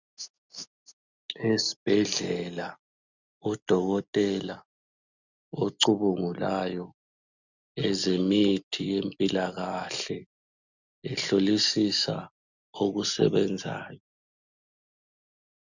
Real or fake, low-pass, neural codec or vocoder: real; 7.2 kHz; none